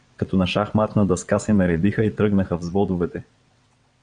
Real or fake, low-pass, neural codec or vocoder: fake; 9.9 kHz; vocoder, 22.05 kHz, 80 mel bands, WaveNeXt